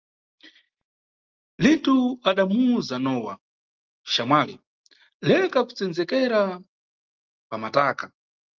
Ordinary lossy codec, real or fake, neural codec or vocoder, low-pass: Opus, 24 kbps; real; none; 7.2 kHz